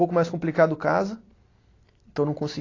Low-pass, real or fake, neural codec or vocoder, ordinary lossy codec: 7.2 kHz; real; none; AAC, 32 kbps